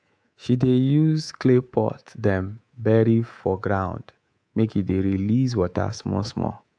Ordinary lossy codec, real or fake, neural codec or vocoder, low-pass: AAC, 64 kbps; fake; codec, 24 kHz, 3.1 kbps, DualCodec; 9.9 kHz